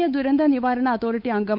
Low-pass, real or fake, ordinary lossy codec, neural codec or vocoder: 5.4 kHz; fake; none; codec, 16 kHz, 8 kbps, FunCodec, trained on Chinese and English, 25 frames a second